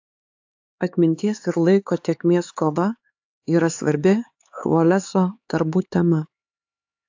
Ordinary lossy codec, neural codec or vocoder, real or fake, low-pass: AAC, 48 kbps; codec, 16 kHz, 4 kbps, X-Codec, HuBERT features, trained on LibriSpeech; fake; 7.2 kHz